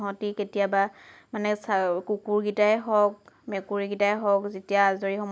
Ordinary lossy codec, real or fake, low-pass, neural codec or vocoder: none; real; none; none